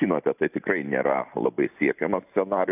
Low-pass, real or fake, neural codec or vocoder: 3.6 kHz; real; none